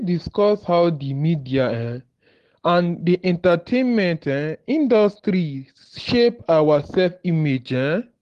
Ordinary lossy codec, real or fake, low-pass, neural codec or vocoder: Opus, 16 kbps; real; 10.8 kHz; none